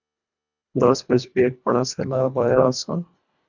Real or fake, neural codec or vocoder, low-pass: fake; codec, 24 kHz, 1.5 kbps, HILCodec; 7.2 kHz